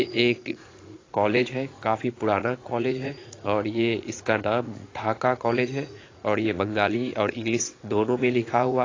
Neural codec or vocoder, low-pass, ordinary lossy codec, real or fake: vocoder, 44.1 kHz, 80 mel bands, Vocos; 7.2 kHz; AAC, 32 kbps; fake